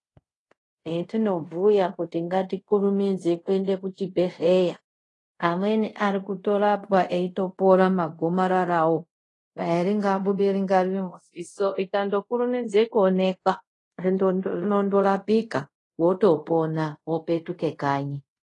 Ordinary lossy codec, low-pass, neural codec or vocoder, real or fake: AAC, 32 kbps; 10.8 kHz; codec, 24 kHz, 0.5 kbps, DualCodec; fake